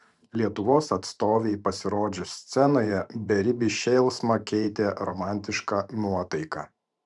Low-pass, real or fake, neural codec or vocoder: 10.8 kHz; fake; vocoder, 44.1 kHz, 128 mel bands every 512 samples, BigVGAN v2